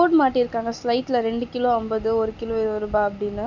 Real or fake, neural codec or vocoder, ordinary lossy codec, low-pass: real; none; none; 7.2 kHz